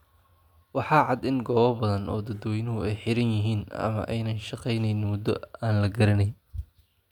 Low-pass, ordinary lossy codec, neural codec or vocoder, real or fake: 19.8 kHz; none; none; real